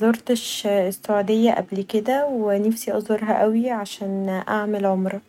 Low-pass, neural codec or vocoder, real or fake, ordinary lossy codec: 19.8 kHz; none; real; none